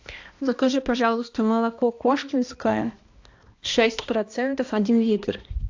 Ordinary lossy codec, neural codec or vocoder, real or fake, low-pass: AAC, 48 kbps; codec, 16 kHz, 1 kbps, X-Codec, HuBERT features, trained on balanced general audio; fake; 7.2 kHz